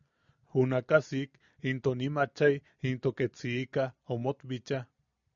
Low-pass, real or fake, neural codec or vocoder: 7.2 kHz; real; none